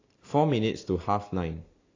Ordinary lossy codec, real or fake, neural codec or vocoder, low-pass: AAC, 32 kbps; real; none; 7.2 kHz